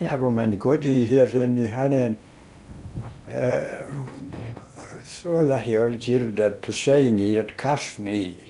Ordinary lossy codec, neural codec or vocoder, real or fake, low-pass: none; codec, 16 kHz in and 24 kHz out, 0.8 kbps, FocalCodec, streaming, 65536 codes; fake; 10.8 kHz